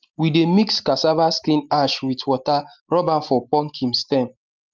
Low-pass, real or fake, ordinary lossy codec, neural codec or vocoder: 7.2 kHz; real; Opus, 32 kbps; none